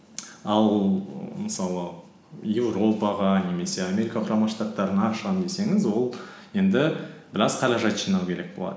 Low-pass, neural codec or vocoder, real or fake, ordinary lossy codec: none; none; real; none